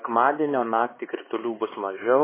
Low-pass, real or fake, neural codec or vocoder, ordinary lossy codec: 3.6 kHz; fake; codec, 16 kHz, 4 kbps, X-Codec, HuBERT features, trained on LibriSpeech; MP3, 16 kbps